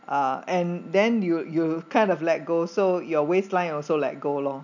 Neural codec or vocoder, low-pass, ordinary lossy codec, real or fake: none; 7.2 kHz; none; real